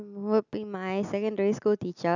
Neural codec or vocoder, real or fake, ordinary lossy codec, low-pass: none; real; none; 7.2 kHz